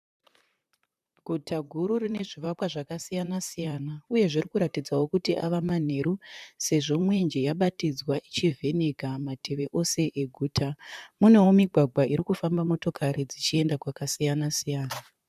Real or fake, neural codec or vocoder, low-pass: fake; vocoder, 44.1 kHz, 128 mel bands, Pupu-Vocoder; 14.4 kHz